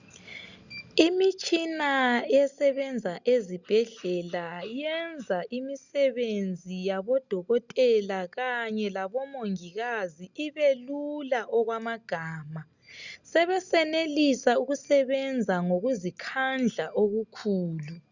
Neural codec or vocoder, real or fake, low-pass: none; real; 7.2 kHz